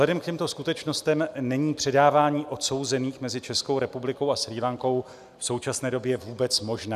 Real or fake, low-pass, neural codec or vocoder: real; 14.4 kHz; none